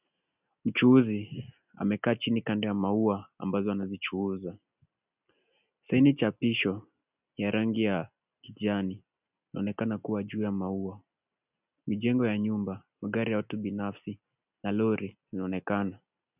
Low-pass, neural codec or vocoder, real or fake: 3.6 kHz; none; real